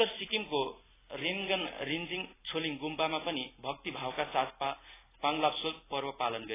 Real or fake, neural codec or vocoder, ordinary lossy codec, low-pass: real; none; AAC, 16 kbps; 3.6 kHz